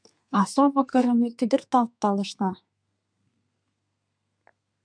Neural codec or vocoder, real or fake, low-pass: codec, 32 kHz, 1.9 kbps, SNAC; fake; 9.9 kHz